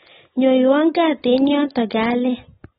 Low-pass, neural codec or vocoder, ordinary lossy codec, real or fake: 14.4 kHz; none; AAC, 16 kbps; real